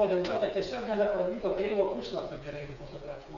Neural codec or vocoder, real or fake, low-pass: codec, 16 kHz, 4 kbps, FreqCodec, smaller model; fake; 7.2 kHz